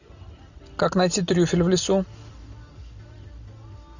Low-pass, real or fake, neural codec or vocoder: 7.2 kHz; real; none